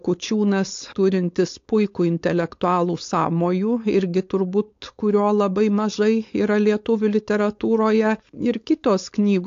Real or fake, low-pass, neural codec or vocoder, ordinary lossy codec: fake; 7.2 kHz; codec, 16 kHz, 4.8 kbps, FACodec; AAC, 48 kbps